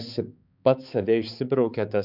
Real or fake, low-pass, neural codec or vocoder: fake; 5.4 kHz; codec, 16 kHz, 4 kbps, X-Codec, HuBERT features, trained on balanced general audio